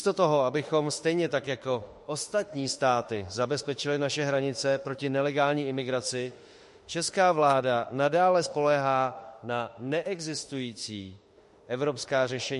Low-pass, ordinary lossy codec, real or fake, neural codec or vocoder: 14.4 kHz; MP3, 48 kbps; fake; autoencoder, 48 kHz, 32 numbers a frame, DAC-VAE, trained on Japanese speech